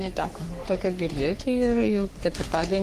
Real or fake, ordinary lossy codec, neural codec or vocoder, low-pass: fake; Opus, 24 kbps; codec, 44.1 kHz, 3.4 kbps, Pupu-Codec; 14.4 kHz